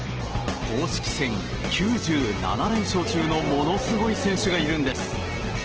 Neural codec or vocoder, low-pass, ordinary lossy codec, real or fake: none; 7.2 kHz; Opus, 16 kbps; real